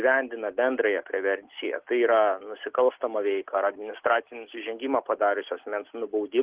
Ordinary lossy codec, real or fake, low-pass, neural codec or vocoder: Opus, 16 kbps; real; 3.6 kHz; none